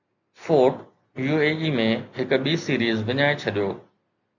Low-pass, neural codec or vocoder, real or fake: 7.2 kHz; none; real